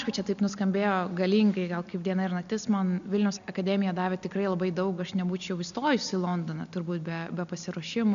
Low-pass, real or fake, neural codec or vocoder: 7.2 kHz; real; none